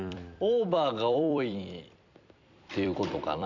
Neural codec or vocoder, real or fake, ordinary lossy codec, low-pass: vocoder, 44.1 kHz, 128 mel bands every 256 samples, BigVGAN v2; fake; MP3, 64 kbps; 7.2 kHz